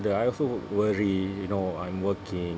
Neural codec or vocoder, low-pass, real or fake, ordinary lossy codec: none; none; real; none